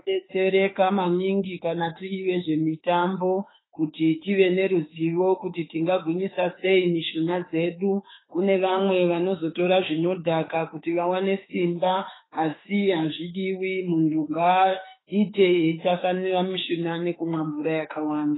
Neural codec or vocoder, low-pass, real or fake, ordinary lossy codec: autoencoder, 48 kHz, 32 numbers a frame, DAC-VAE, trained on Japanese speech; 7.2 kHz; fake; AAC, 16 kbps